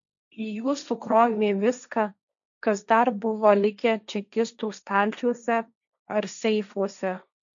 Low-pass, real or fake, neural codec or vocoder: 7.2 kHz; fake; codec, 16 kHz, 1.1 kbps, Voila-Tokenizer